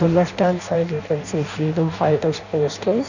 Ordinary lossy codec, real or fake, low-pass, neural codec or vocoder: none; fake; 7.2 kHz; codec, 16 kHz in and 24 kHz out, 0.6 kbps, FireRedTTS-2 codec